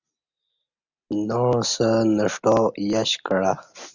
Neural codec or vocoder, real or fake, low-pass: none; real; 7.2 kHz